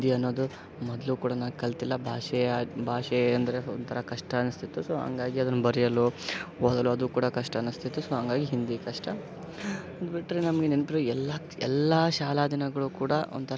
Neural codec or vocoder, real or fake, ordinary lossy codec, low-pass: none; real; none; none